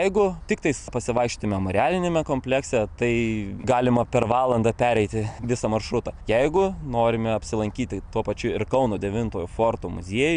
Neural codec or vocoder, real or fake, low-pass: none; real; 9.9 kHz